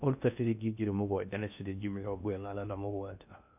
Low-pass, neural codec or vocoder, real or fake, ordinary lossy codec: 3.6 kHz; codec, 16 kHz in and 24 kHz out, 0.6 kbps, FocalCodec, streaming, 2048 codes; fake; none